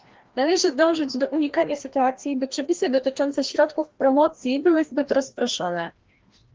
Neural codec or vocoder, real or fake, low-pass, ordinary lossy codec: codec, 16 kHz, 1 kbps, FreqCodec, larger model; fake; 7.2 kHz; Opus, 16 kbps